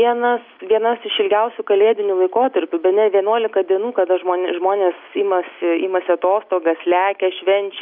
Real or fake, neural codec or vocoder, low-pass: real; none; 5.4 kHz